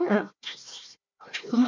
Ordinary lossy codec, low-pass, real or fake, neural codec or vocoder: MP3, 64 kbps; 7.2 kHz; fake; codec, 16 kHz, 1 kbps, FunCodec, trained on Chinese and English, 50 frames a second